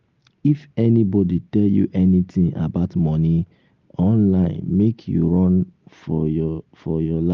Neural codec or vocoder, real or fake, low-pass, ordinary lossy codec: none; real; 7.2 kHz; Opus, 32 kbps